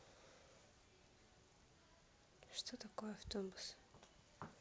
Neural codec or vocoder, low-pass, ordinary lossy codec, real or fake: none; none; none; real